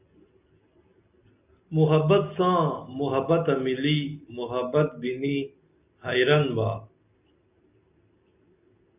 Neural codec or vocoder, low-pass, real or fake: none; 3.6 kHz; real